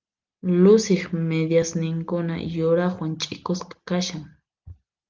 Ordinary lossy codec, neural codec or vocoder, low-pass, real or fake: Opus, 32 kbps; none; 7.2 kHz; real